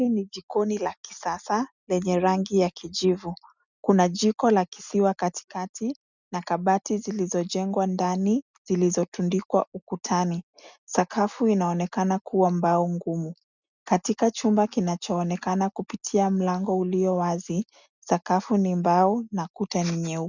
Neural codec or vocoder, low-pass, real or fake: none; 7.2 kHz; real